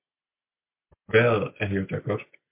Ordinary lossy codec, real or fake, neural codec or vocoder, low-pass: MP3, 32 kbps; real; none; 3.6 kHz